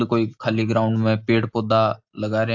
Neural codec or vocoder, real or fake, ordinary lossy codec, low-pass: none; real; AAC, 48 kbps; 7.2 kHz